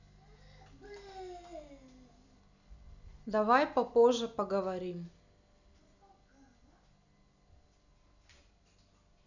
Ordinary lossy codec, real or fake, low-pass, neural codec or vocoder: none; real; 7.2 kHz; none